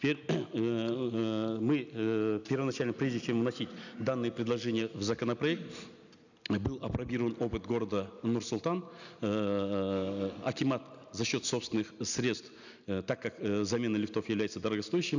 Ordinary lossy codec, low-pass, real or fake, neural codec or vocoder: none; 7.2 kHz; real; none